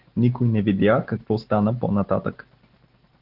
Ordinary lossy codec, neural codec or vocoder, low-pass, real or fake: Opus, 16 kbps; none; 5.4 kHz; real